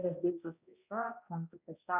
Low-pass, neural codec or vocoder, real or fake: 3.6 kHz; codec, 16 kHz, 0.5 kbps, X-Codec, HuBERT features, trained on balanced general audio; fake